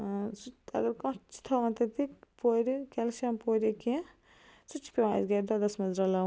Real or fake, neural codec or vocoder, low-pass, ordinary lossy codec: real; none; none; none